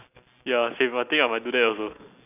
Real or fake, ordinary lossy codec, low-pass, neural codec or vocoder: real; none; 3.6 kHz; none